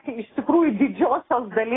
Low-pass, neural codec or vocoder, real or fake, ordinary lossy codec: 7.2 kHz; none; real; AAC, 16 kbps